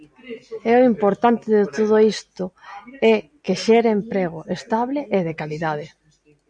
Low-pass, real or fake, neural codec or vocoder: 9.9 kHz; real; none